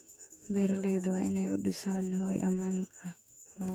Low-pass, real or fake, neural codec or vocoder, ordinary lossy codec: none; fake; codec, 44.1 kHz, 2.6 kbps, DAC; none